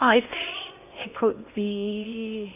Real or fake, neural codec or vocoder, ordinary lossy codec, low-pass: fake; codec, 16 kHz in and 24 kHz out, 0.6 kbps, FocalCodec, streaming, 4096 codes; AAC, 32 kbps; 3.6 kHz